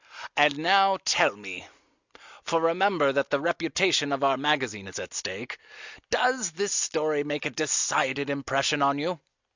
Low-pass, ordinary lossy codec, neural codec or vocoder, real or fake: 7.2 kHz; Opus, 64 kbps; none; real